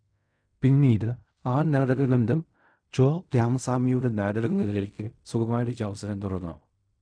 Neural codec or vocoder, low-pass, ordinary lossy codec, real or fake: codec, 16 kHz in and 24 kHz out, 0.4 kbps, LongCat-Audio-Codec, fine tuned four codebook decoder; 9.9 kHz; none; fake